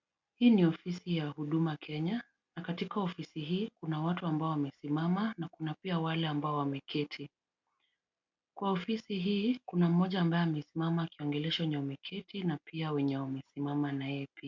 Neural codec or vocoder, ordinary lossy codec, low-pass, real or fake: none; MP3, 64 kbps; 7.2 kHz; real